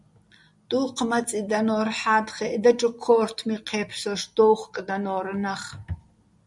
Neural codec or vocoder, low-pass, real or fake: none; 10.8 kHz; real